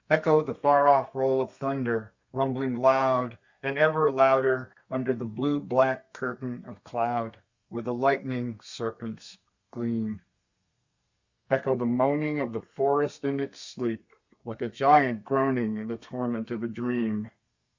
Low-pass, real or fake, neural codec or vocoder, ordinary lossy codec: 7.2 kHz; fake; codec, 32 kHz, 1.9 kbps, SNAC; Opus, 64 kbps